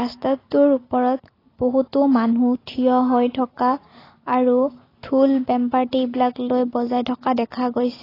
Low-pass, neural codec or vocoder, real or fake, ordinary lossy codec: 5.4 kHz; none; real; AAC, 24 kbps